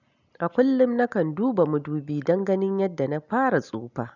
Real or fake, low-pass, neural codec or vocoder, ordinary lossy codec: real; 7.2 kHz; none; none